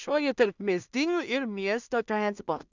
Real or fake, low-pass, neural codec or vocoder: fake; 7.2 kHz; codec, 16 kHz in and 24 kHz out, 0.4 kbps, LongCat-Audio-Codec, two codebook decoder